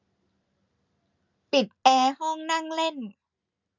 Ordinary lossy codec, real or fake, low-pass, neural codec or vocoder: none; real; 7.2 kHz; none